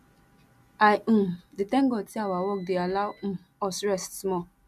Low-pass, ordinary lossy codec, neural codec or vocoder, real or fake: 14.4 kHz; none; none; real